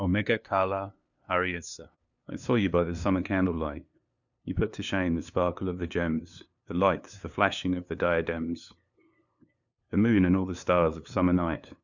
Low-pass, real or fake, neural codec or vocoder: 7.2 kHz; fake; codec, 16 kHz, 2 kbps, FunCodec, trained on LibriTTS, 25 frames a second